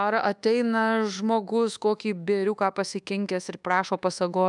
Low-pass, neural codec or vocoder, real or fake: 10.8 kHz; codec, 24 kHz, 1.2 kbps, DualCodec; fake